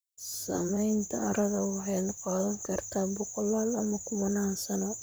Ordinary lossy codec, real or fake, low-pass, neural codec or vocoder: none; fake; none; vocoder, 44.1 kHz, 128 mel bands, Pupu-Vocoder